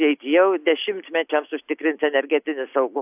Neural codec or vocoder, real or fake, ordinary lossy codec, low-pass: none; real; AAC, 32 kbps; 3.6 kHz